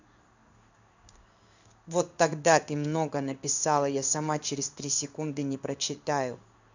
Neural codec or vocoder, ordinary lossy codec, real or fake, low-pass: codec, 16 kHz in and 24 kHz out, 1 kbps, XY-Tokenizer; none; fake; 7.2 kHz